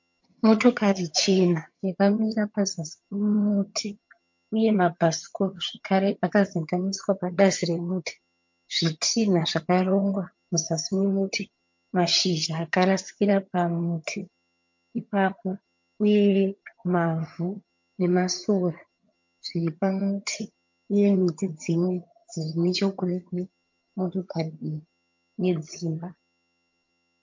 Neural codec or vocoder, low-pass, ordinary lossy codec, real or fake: vocoder, 22.05 kHz, 80 mel bands, HiFi-GAN; 7.2 kHz; MP3, 48 kbps; fake